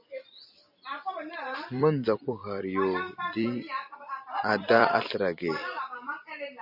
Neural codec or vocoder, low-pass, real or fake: vocoder, 44.1 kHz, 128 mel bands every 256 samples, BigVGAN v2; 5.4 kHz; fake